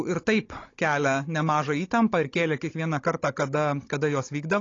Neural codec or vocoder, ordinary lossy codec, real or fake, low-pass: codec, 16 kHz, 16 kbps, FunCodec, trained on Chinese and English, 50 frames a second; AAC, 32 kbps; fake; 7.2 kHz